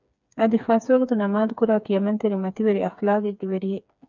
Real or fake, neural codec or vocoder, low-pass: fake; codec, 16 kHz, 4 kbps, FreqCodec, smaller model; 7.2 kHz